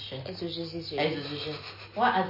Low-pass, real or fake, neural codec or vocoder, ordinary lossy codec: 5.4 kHz; real; none; MP3, 24 kbps